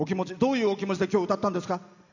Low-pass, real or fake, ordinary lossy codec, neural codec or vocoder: 7.2 kHz; real; none; none